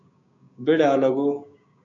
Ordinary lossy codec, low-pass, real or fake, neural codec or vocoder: MP3, 64 kbps; 7.2 kHz; fake; codec, 16 kHz, 6 kbps, DAC